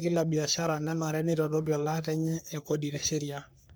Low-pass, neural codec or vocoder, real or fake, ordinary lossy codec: none; codec, 44.1 kHz, 3.4 kbps, Pupu-Codec; fake; none